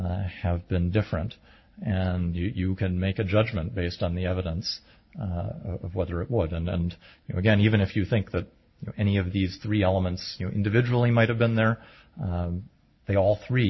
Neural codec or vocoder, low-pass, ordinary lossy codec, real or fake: none; 7.2 kHz; MP3, 24 kbps; real